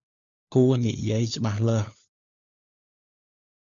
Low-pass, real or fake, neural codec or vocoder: 7.2 kHz; fake; codec, 16 kHz, 4 kbps, FunCodec, trained on LibriTTS, 50 frames a second